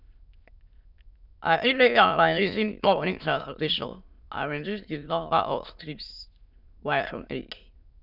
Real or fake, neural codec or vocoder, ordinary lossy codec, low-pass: fake; autoencoder, 22.05 kHz, a latent of 192 numbers a frame, VITS, trained on many speakers; none; 5.4 kHz